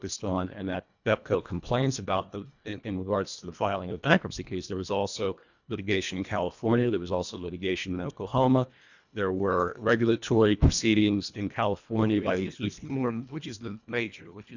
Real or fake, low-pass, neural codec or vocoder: fake; 7.2 kHz; codec, 24 kHz, 1.5 kbps, HILCodec